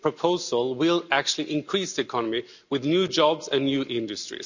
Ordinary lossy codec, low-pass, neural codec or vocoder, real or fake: none; 7.2 kHz; none; real